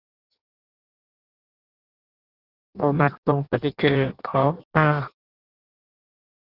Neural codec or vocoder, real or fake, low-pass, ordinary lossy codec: codec, 16 kHz in and 24 kHz out, 0.6 kbps, FireRedTTS-2 codec; fake; 5.4 kHz; Opus, 64 kbps